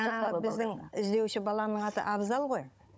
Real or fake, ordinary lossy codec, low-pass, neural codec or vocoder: fake; none; none; codec, 16 kHz, 16 kbps, FunCodec, trained on Chinese and English, 50 frames a second